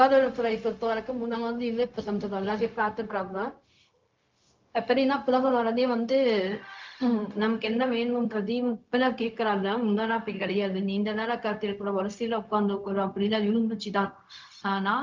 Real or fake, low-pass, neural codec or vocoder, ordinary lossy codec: fake; 7.2 kHz; codec, 16 kHz, 0.4 kbps, LongCat-Audio-Codec; Opus, 24 kbps